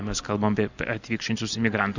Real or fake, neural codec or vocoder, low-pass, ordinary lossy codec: real; none; 7.2 kHz; Opus, 64 kbps